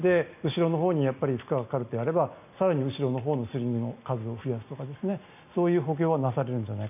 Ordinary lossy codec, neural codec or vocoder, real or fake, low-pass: none; none; real; 3.6 kHz